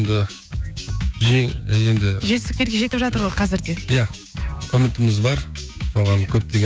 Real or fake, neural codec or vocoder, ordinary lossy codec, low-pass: fake; codec, 16 kHz, 6 kbps, DAC; none; none